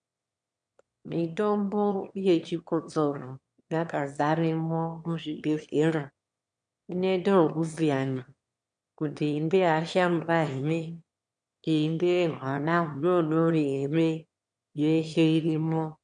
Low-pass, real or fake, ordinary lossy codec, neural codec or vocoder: 9.9 kHz; fake; MP3, 64 kbps; autoencoder, 22.05 kHz, a latent of 192 numbers a frame, VITS, trained on one speaker